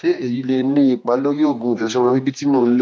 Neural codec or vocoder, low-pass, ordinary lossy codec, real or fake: codec, 16 kHz, 2 kbps, X-Codec, HuBERT features, trained on general audio; none; none; fake